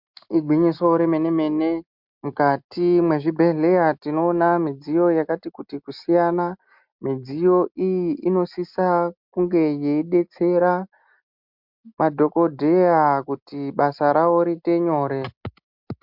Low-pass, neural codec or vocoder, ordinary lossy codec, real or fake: 5.4 kHz; none; MP3, 48 kbps; real